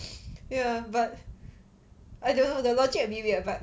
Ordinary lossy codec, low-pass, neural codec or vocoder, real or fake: none; none; none; real